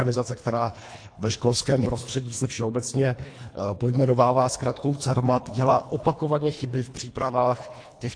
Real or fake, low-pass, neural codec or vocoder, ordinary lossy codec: fake; 9.9 kHz; codec, 24 kHz, 1.5 kbps, HILCodec; AAC, 48 kbps